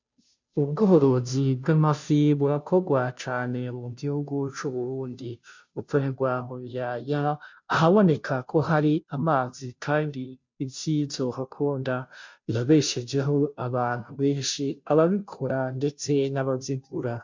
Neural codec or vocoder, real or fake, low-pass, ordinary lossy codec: codec, 16 kHz, 0.5 kbps, FunCodec, trained on Chinese and English, 25 frames a second; fake; 7.2 kHz; MP3, 64 kbps